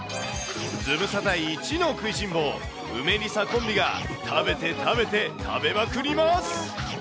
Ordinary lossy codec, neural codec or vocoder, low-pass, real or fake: none; none; none; real